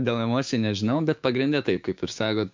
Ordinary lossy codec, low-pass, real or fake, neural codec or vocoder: MP3, 64 kbps; 7.2 kHz; fake; codec, 16 kHz, 6 kbps, DAC